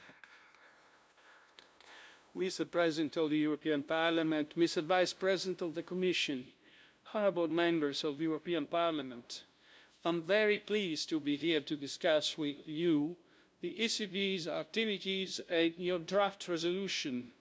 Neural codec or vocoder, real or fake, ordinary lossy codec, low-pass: codec, 16 kHz, 0.5 kbps, FunCodec, trained on LibriTTS, 25 frames a second; fake; none; none